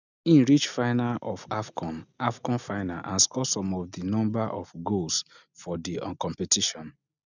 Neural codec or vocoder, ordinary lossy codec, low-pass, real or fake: none; none; 7.2 kHz; real